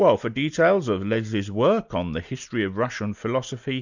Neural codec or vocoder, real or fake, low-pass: none; real; 7.2 kHz